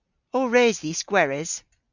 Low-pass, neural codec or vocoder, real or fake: 7.2 kHz; none; real